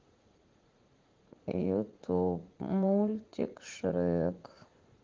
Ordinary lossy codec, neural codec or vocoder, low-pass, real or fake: Opus, 16 kbps; vocoder, 44.1 kHz, 80 mel bands, Vocos; 7.2 kHz; fake